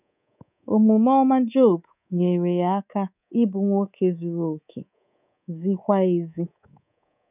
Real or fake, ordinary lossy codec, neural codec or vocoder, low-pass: fake; none; codec, 24 kHz, 3.1 kbps, DualCodec; 3.6 kHz